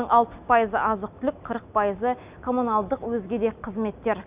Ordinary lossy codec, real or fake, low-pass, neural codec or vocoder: Opus, 64 kbps; real; 3.6 kHz; none